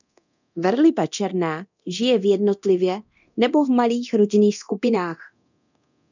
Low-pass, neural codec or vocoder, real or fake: 7.2 kHz; codec, 24 kHz, 0.9 kbps, DualCodec; fake